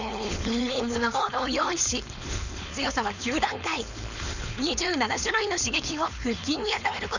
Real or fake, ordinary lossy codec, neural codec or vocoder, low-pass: fake; none; codec, 16 kHz, 4.8 kbps, FACodec; 7.2 kHz